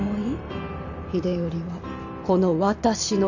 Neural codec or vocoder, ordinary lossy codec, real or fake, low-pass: vocoder, 44.1 kHz, 128 mel bands every 512 samples, BigVGAN v2; none; fake; 7.2 kHz